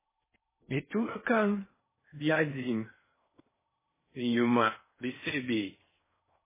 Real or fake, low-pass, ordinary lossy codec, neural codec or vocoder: fake; 3.6 kHz; MP3, 16 kbps; codec, 16 kHz in and 24 kHz out, 0.6 kbps, FocalCodec, streaming, 4096 codes